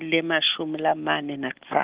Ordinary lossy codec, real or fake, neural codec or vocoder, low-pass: Opus, 16 kbps; real; none; 3.6 kHz